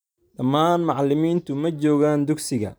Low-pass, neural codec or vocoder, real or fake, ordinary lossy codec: none; vocoder, 44.1 kHz, 128 mel bands every 256 samples, BigVGAN v2; fake; none